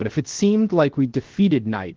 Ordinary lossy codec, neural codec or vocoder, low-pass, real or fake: Opus, 16 kbps; codec, 16 kHz, 0.7 kbps, FocalCodec; 7.2 kHz; fake